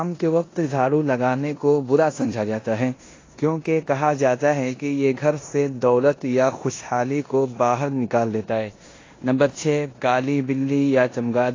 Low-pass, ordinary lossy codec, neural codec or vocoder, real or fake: 7.2 kHz; AAC, 32 kbps; codec, 16 kHz in and 24 kHz out, 0.9 kbps, LongCat-Audio-Codec, four codebook decoder; fake